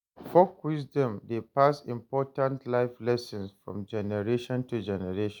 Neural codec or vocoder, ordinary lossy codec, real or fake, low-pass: none; none; real; 19.8 kHz